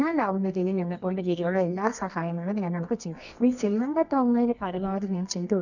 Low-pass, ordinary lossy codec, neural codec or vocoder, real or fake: 7.2 kHz; none; codec, 24 kHz, 0.9 kbps, WavTokenizer, medium music audio release; fake